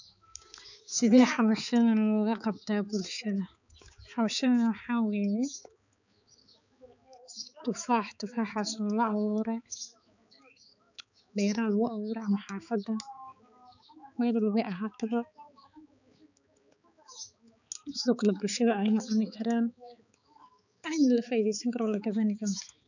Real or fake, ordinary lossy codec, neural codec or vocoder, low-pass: fake; none; codec, 16 kHz, 4 kbps, X-Codec, HuBERT features, trained on balanced general audio; 7.2 kHz